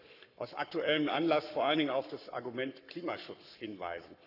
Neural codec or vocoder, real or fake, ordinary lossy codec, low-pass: codec, 44.1 kHz, 7.8 kbps, Pupu-Codec; fake; none; 5.4 kHz